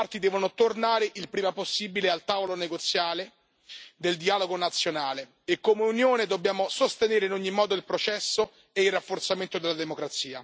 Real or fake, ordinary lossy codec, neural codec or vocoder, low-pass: real; none; none; none